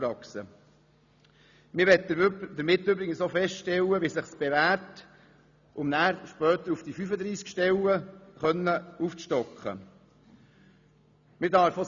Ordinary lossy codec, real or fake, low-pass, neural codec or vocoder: none; real; 7.2 kHz; none